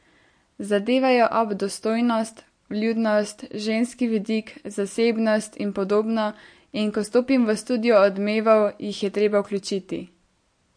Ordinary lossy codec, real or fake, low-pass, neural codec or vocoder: MP3, 48 kbps; real; 9.9 kHz; none